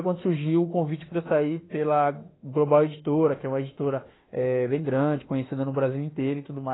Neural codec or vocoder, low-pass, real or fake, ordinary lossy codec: autoencoder, 48 kHz, 32 numbers a frame, DAC-VAE, trained on Japanese speech; 7.2 kHz; fake; AAC, 16 kbps